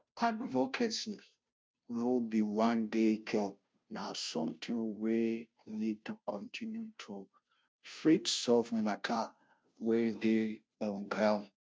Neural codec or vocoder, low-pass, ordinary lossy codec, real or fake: codec, 16 kHz, 0.5 kbps, FunCodec, trained on Chinese and English, 25 frames a second; none; none; fake